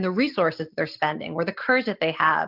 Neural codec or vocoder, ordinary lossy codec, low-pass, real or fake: none; Opus, 24 kbps; 5.4 kHz; real